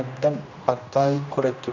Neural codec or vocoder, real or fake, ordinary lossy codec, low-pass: codec, 16 kHz, 1 kbps, X-Codec, HuBERT features, trained on general audio; fake; none; 7.2 kHz